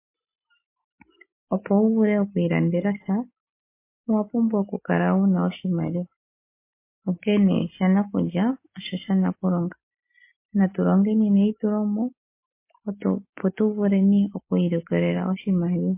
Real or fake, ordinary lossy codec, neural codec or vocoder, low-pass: real; MP3, 24 kbps; none; 3.6 kHz